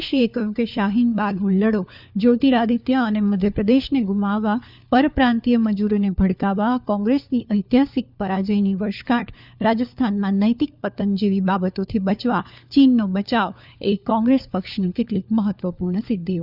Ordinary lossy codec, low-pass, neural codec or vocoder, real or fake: none; 5.4 kHz; codec, 16 kHz, 4 kbps, FunCodec, trained on LibriTTS, 50 frames a second; fake